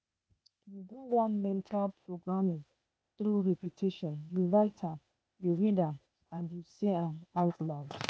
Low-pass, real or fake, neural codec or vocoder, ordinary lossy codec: none; fake; codec, 16 kHz, 0.8 kbps, ZipCodec; none